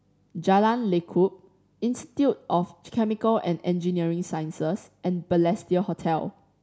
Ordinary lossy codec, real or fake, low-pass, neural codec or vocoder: none; real; none; none